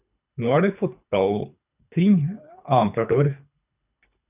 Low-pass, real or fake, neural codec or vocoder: 3.6 kHz; fake; codec, 24 kHz, 3 kbps, HILCodec